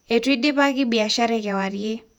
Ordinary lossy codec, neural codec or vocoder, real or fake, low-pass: none; vocoder, 48 kHz, 128 mel bands, Vocos; fake; 19.8 kHz